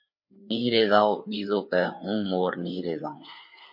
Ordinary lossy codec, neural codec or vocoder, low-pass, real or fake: MP3, 32 kbps; codec, 16 kHz, 4 kbps, FreqCodec, larger model; 5.4 kHz; fake